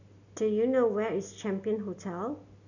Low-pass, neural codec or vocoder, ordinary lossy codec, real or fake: 7.2 kHz; none; none; real